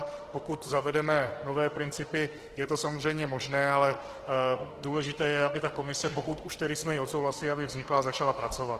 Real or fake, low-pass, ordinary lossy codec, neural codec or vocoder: fake; 14.4 kHz; Opus, 16 kbps; autoencoder, 48 kHz, 32 numbers a frame, DAC-VAE, trained on Japanese speech